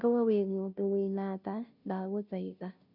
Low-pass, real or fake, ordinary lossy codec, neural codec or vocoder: 5.4 kHz; fake; none; codec, 16 kHz, 0.5 kbps, FunCodec, trained on Chinese and English, 25 frames a second